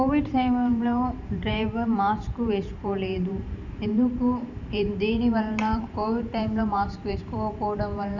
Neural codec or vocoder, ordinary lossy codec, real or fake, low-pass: vocoder, 44.1 kHz, 128 mel bands every 512 samples, BigVGAN v2; none; fake; 7.2 kHz